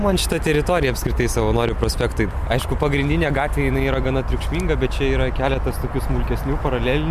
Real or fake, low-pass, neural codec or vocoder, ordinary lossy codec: real; 14.4 kHz; none; MP3, 96 kbps